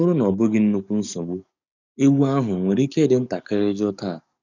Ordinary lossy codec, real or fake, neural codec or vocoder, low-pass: none; fake; codec, 44.1 kHz, 7.8 kbps, DAC; 7.2 kHz